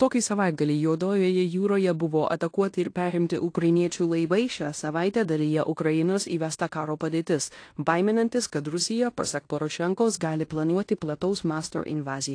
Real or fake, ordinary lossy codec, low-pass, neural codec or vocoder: fake; AAC, 48 kbps; 9.9 kHz; codec, 16 kHz in and 24 kHz out, 0.9 kbps, LongCat-Audio-Codec, fine tuned four codebook decoder